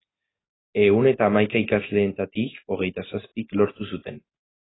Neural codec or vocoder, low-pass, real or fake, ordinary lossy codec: none; 7.2 kHz; real; AAC, 16 kbps